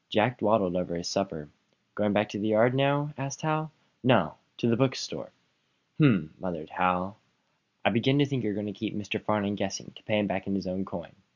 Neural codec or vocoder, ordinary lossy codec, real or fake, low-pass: none; Opus, 64 kbps; real; 7.2 kHz